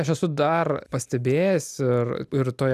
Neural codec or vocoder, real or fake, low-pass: none; real; 14.4 kHz